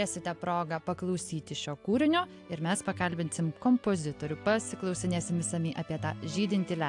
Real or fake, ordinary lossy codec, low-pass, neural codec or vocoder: real; AAC, 64 kbps; 10.8 kHz; none